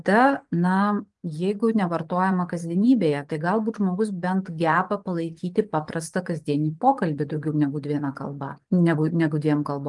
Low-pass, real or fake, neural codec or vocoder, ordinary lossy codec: 10.8 kHz; fake; vocoder, 24 kHz, 100 mel bands, Vocos; Opus, 32 kbps